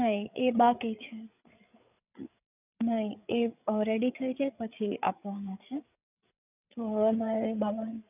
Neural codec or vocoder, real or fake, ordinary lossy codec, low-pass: codec, 16 kHz, 8 kbps, FreqCodec, larger model; fake; none; 3.6 kHz